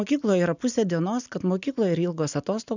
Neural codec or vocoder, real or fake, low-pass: none; real; 7.2 kHz